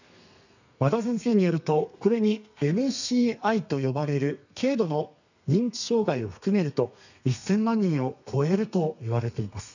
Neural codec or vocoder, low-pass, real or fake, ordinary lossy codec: codec, 32 kHz, 1.9 kbps, SNAC; 7.2 kHz; fake; none